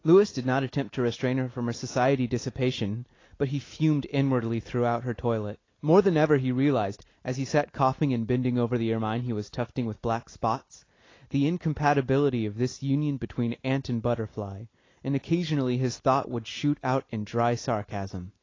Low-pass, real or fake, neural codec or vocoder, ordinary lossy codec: 7.2 kHz; real; none; AAC, 32 kbps